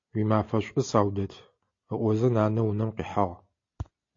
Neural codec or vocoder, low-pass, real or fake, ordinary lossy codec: none; 7.2 kHz; real; AAC, 48 kbps